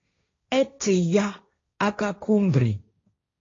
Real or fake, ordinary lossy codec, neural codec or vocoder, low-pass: fake; AAC, 32 kbps; codec, 16 kHz, 1.1 kbps, Voila-Tokenizer; 7.2 kHz